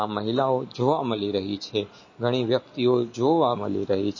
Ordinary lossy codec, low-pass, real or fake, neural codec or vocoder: MP3, 32 kbps; 7.2 kHz; real; none